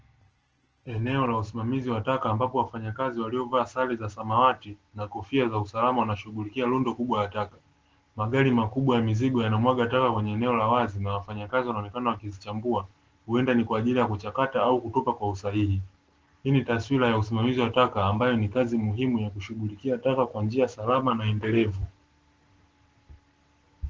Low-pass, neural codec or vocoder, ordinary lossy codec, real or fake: 7.2 kHz; none; Opus, 16 kbps; real